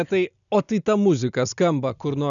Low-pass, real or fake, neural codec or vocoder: 7.2 kHz; real; none